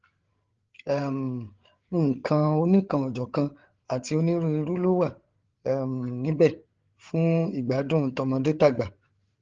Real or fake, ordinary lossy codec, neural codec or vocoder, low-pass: fake; Opus, 16 kbps; codec, 16 kHz, 8 kbps, FreqCodec, larger model; 7.2 kHz